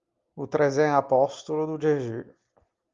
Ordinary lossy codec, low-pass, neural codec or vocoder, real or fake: Opus, 32 kbps; 7.2 kHz; none; real